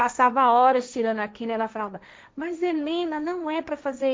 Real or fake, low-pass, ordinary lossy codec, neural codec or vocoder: fake; none; none; codec, 16 kHz, 1.1 kbps, Voila-Tokenizer